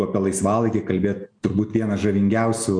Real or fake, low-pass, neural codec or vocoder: real; 9.9 kHz; none